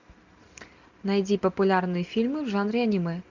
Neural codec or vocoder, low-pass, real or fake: none; 7.2 kHz; real